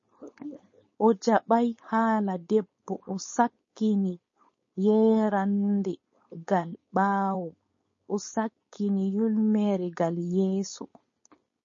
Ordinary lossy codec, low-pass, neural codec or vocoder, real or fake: MP3, 32 kbps; 7.2 kHz; codec, 16 kHz, 4.8 kbps, FACodec; fake